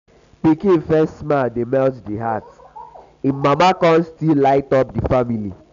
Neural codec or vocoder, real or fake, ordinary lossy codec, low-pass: none; real; none; 7.2 kHz